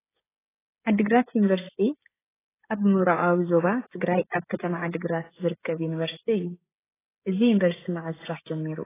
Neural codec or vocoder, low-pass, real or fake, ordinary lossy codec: codec, 16 kHz, 16 kbps, FreqCodec, smaller model; 3.6 kHz; fake; AAC, 16 kbps